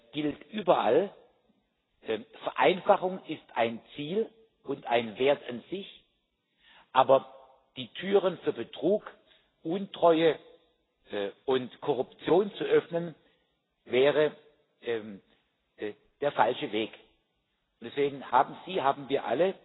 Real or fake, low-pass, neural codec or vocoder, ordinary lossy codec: real; 7.2 kHz; none; AAC, 16 kbps